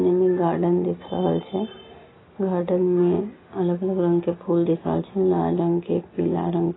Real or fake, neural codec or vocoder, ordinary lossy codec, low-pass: real; none; AAC, 16 kbps; 7.2 kHz